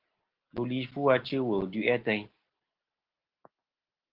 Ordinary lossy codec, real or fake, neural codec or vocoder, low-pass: Opus, 16 kbps; real; none; 5.4 kHz